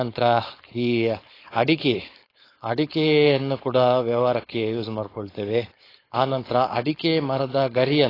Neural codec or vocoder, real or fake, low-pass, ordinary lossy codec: codec, 16 kHz, 4.8 kbps, FACodec; fake; 5.4 kHz; AAC, 24 kbps